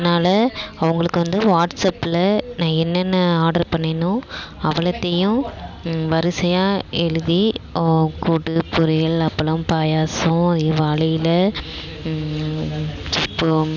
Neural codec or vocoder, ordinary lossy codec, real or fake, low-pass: none; none; real; 7.2 kHz